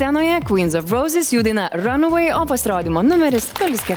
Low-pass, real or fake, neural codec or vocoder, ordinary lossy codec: 19.8 kHz; real; none; Opus, 24 kbps